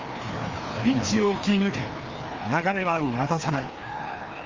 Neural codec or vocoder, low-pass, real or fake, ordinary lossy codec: codec, 16 kHz, 2 kbps, FreqCodec, larger model; 7.2 kHz; fake; Opus, 32 kbps